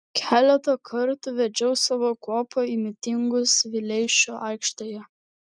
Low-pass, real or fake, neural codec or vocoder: 9.9 kHz; real; none